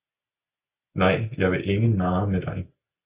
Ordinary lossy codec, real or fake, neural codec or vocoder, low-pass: Opus, 64 kbps; real; none; 3.6 kHz